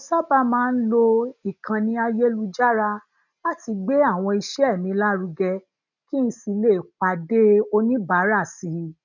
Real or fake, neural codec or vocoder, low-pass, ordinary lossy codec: real; none; 7.2 kHz; none